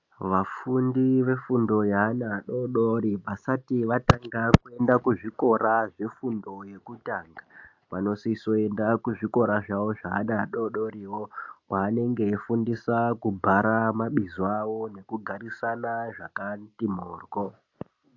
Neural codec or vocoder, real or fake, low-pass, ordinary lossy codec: none; real; 7.2 kHz; AAC, 48 kbps